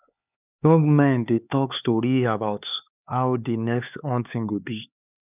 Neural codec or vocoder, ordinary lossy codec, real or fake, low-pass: codec, 16 kHz, 4 kbps, X-Codec, HuBERT features, trained on LibriSpeech; none; fake; 3.6 kHz